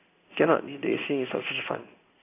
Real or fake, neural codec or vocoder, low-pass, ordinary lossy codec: fake; codec, 16 kHz in and 24 kHz out, 1 kbps, XY-Tokenizer; 3.6 kHz; AAC, 24 kbps